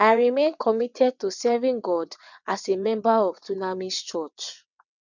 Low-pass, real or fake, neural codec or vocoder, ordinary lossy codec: 7.2 kHz; fake; vocoder, 22.05 kHz, 80 mel bands, WaveNeXt; none